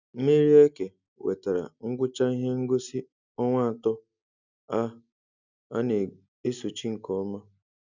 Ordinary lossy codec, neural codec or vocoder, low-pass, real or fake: none; none; 7.2 kHz; real